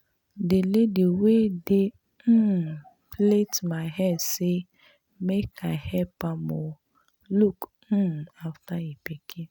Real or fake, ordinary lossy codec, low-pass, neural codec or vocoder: real; none; 19.8 kHz; none